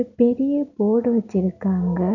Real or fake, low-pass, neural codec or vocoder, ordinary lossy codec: real; 7.2 kHz; none; none